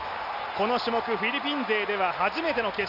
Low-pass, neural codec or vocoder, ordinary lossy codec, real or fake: 5.4 kHz; none; none; real